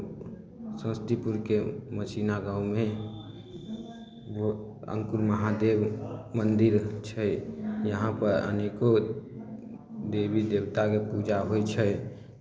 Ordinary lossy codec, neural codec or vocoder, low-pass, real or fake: none; none; none; real